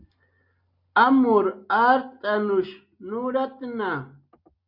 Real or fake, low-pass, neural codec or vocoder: real; 5.4 kHz; none